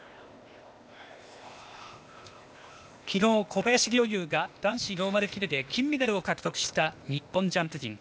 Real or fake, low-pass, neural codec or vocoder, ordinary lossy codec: fake; none; codec, 16 kHz, 0.8 kbps, ZipCodec; none